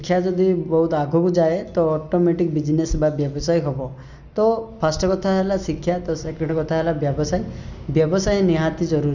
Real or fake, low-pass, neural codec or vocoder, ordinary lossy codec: real; 7.2 kHz; none; none